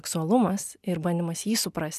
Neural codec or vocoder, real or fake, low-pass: none; real; 14.4 kHz